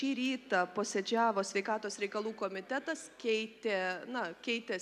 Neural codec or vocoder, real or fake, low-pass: none; real; 14.4 kHz